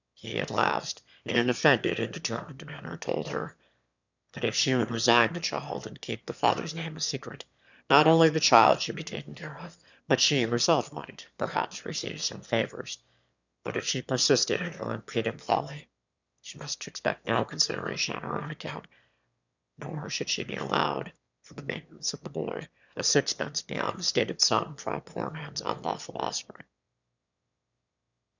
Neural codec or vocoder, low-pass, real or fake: autoencoder, 22.05 kHz, a latent of 192 numbers a frame, VITS, trained on one speaker; 7.2 kHz; fake